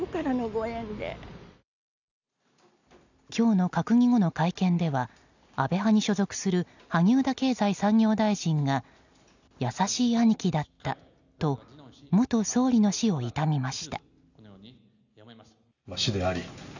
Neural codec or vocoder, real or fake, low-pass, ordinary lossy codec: none; real; 7.2 kHz; none